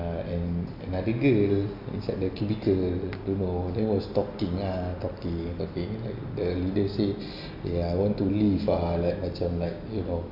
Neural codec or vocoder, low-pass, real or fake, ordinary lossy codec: none; 5.4 kHz; real; MP3, 48 kbps